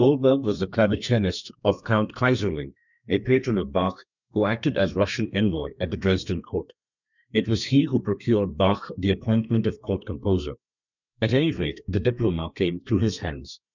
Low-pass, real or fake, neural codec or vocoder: 7.2 kHz; fake; codec, 32 kHz, 1.9 kbps, SNAC